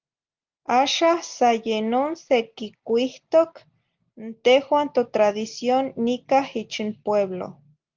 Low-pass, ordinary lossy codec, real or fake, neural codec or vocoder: 7.2 kHz; Opus, 32 kbps; real; none